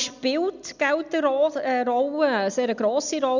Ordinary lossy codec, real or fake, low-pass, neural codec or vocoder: none; real; 7.2 kHz; none